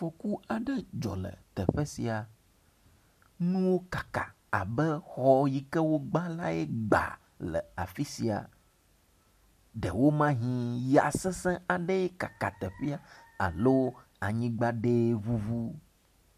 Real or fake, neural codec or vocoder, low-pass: real; none; 14.4 kHz